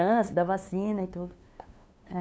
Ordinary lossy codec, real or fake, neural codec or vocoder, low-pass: none; fake; codec, 16 kHz, 2 kbps, FunCodec, trained on LibriTTS, 25 frames a second; none